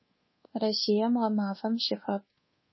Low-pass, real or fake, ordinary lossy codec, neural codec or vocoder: 7.2 kHz; fake; MP3, 24 kbps; codec, 24 kHz, 1.2 kbps, DualCodec